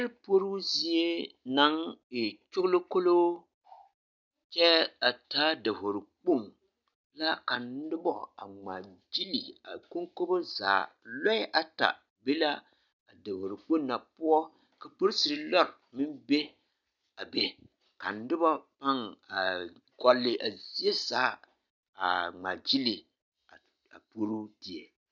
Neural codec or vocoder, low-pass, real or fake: none; 7.2 kHz; real